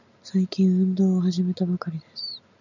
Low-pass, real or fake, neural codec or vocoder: 7.2 kHz; real; none